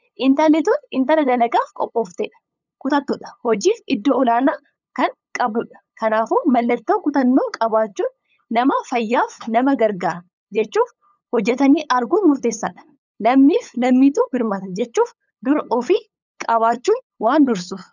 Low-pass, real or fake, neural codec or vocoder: 7.2 kHz; fake; codec, 16 kHz, 8 kbps, FunCodec, trained on LibriTTS, 25 frames a second